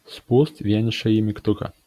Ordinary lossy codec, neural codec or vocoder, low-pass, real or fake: Opus, 64 kbps; none; 14.4 kHz; real